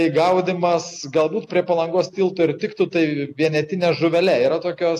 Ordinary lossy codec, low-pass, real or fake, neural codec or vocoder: AAC, 64 kbps; 14.4 kHz; real; none